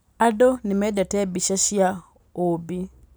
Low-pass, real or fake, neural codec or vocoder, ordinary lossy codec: none; real; none; none